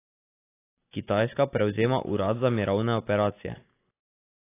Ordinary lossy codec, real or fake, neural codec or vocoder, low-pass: AAC, 24 kbps; real; none; 3.6 kHz